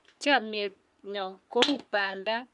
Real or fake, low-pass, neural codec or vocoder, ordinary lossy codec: fake; 10.8 kHz; codec, 44.1 kHz, 3.4 kbps, Pupu-Codec; none